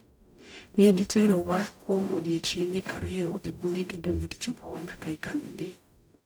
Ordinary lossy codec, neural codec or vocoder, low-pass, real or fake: none; codec, 44.1 kHz, 0.9 kbps, DAC; none; fake